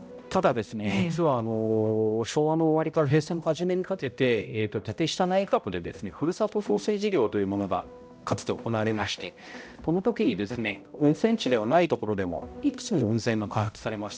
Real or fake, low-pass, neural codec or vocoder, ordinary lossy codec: fake; none; codec, 16 kHz, 0.5 kbps, X-Codec, HuBERT features, trained on balanced general audio; none